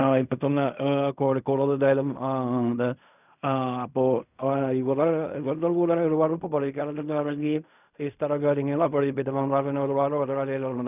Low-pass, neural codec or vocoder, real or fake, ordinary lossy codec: 3.6 kHz; codec, 16 kHz in and 24 kHz out, 0.4 kbps, LongCat-Audio-Codec, fine tuned four codebook decoder; fake; none